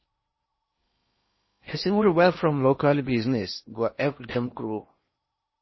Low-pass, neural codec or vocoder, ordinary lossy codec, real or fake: 7.2 kHz; codec, 16 kHz in and 24 kHz out, 0.8 kbps, FocalCodec, streaming, 65536 codes; MP3, 24 kbps; fake